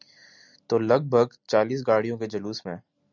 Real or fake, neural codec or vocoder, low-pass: real; none; 7.2 kHz